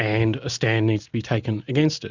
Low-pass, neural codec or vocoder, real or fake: 7.2 kHz; none; real